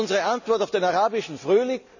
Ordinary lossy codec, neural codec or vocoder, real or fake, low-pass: none; none; real; 7.2 kHz